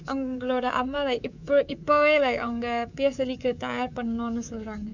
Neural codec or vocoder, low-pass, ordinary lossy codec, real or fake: codec, 24 kHz, 3.1 kbps, DualCodec; 7.2 kHz; none; fake